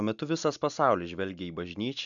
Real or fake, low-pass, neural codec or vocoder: real; 7.2 kHz; none